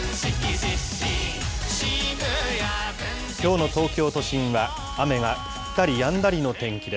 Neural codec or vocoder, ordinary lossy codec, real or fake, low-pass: none; none; real; none